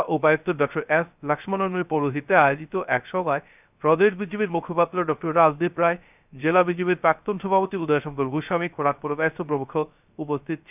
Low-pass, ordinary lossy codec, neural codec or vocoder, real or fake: 3.6 kHz; none; codec, 16 kHz, 0.3 kbps, FocalCodec; fake